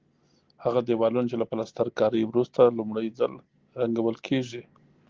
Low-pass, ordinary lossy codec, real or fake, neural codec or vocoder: 7.2 kHz; Opus, 16 kbps; real; none